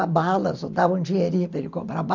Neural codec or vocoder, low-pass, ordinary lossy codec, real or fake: none; 7.2 kHz; MP3, 64 kbps; real